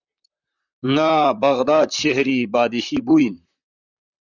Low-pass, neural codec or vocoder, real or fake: 7.2 kHz; vocoder, 44.1 kHz, 128 mel bands, Pupu-Vocoder; fake